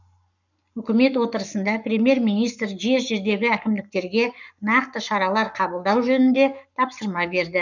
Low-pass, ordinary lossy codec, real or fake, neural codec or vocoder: 7.2 kHz; none; fake; codec, 44.1 kHz, 7.8 kbps, Pupu-Codec